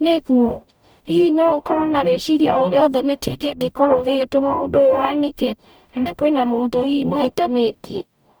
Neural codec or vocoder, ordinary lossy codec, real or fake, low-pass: codec, 44.1 kHz, 0.9 kbps, DAC; none; fake; none